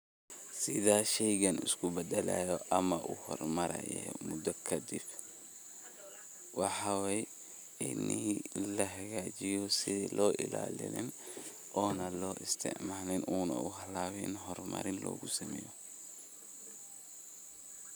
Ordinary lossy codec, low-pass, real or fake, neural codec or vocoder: none; none; real; none